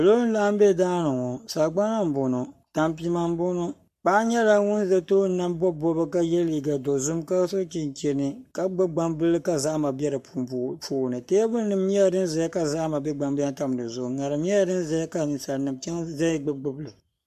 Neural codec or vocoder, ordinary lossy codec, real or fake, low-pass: codec, 44.1 kHz, 7.8 kbps, Pupu-Codec; MP3, 64 kbps; fake; 14.4 kHz